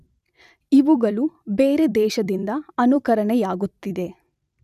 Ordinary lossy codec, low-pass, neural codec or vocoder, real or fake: none; 14.4 kHz; vocoder, 44.1 kHz, 128 mel bands every 256 samples, BigVGAN v2; fake